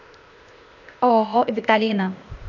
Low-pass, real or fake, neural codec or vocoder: 7.2 kHz; fake; codec, 16 kHz, 0.8 kbps, ZipCodec